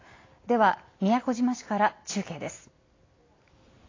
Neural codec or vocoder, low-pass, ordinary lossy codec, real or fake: none; 7.2 kHz; AAC, 32 kbps; real